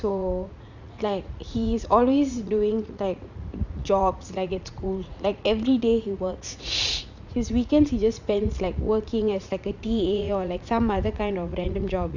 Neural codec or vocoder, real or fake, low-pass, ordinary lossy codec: vocoder, 44.1 kHz, 80 mel bands, Vocos; fake; 7.2 kHz; none